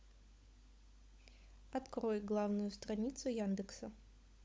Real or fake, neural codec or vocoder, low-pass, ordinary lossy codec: fake; codec, 16 kHz, 16 kbps, FunCodec, trained on LibriTTS, 50 frames a second; none; none